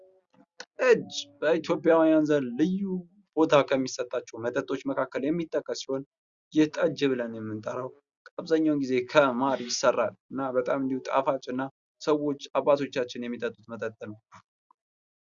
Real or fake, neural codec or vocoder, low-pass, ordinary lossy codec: real; none; 7.2 kHz; Opus, 64 kbps